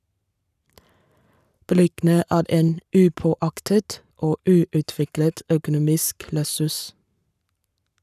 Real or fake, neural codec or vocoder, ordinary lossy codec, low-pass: fake; codec, 44.1 kHz, 7.8 kbps, Pupu-Codec; none; 14.4 kHz